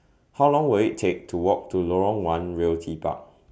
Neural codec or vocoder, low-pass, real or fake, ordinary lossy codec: none; none; real; none